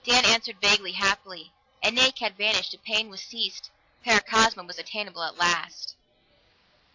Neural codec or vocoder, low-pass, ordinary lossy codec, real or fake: none; 7.2 kHz; AAC, 48 kbps; real